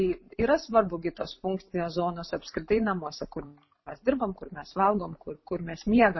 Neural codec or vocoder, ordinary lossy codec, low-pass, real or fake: vocoder, 44.1 kHz, 128 mel bands every 512 samples, BigVGAN v2; MP3, 24 kbps; 7.2 kHz; fake